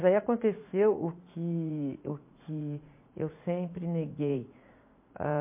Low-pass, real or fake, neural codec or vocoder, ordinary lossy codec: 3.6 kHz; fake; vocoder, 44.1 kHz, 80 mel bands, Vocos; MP3, 24 kbps